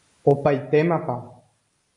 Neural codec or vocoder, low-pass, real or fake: none; 10.8 kHz; real